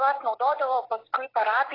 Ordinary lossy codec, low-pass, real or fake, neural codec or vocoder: AAC, 24 kbps; 5.4 kHz; fake; vocoder, 44.1 kHz, 128 mel bands every 256 samples, BigVGAN v2